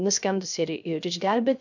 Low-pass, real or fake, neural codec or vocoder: 7.2 kHz; fake; codec, 16 kHz, 0.3 kbps, FocalCodec